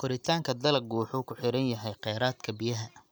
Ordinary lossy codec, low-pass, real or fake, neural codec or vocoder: none; none; real; none